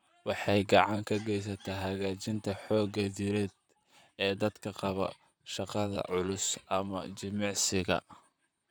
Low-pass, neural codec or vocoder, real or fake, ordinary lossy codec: none; vocoder, 44.1 kHz, 128 mel bands, Pupu-Vocoder; fake; none